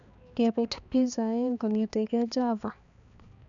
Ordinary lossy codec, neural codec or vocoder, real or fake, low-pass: none; codec, 16 kHz, 2 kbps, X-Codec, HuBERT features, trained on balanced general audio; fake; 7.2 kHz